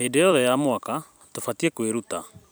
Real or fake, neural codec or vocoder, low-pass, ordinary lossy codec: fake; vocoder, 44.1 kHz, 128 mel bands every 256 samples, BigVGAN v2; none; none